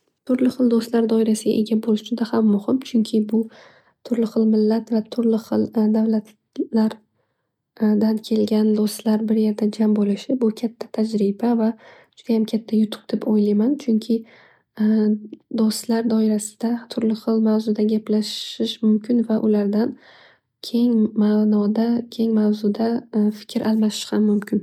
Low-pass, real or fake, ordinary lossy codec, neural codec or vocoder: 19.8 kHz; real; MP3, 96 kbps; none